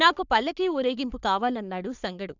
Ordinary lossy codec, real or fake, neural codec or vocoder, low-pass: none; fake; codec, 44.1 kHz, 3.4 kbps, Pupu-Codec; 7.2 kHz